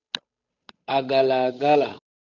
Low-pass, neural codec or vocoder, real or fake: 7.2 kHz; codec, 16 kHz, 8 kbps, FunCodec, trained on Chinese and English, 25 frames a second; fake